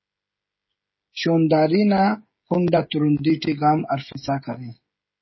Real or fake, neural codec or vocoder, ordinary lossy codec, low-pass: fake; codec, 16 kHz, 16 kbps, FreqCodec, smaller model; MP3, 24 kbps; 7.2 kHz